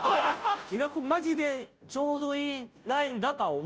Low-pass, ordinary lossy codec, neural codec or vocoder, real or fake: none; none; codec, 16 kHz, 0.5 kbps, FunCodec, trained on Chinese and English, 25 frames a second; fake